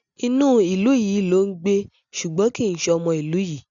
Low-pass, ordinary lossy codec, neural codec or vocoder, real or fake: 7.2 kHz; none; none; real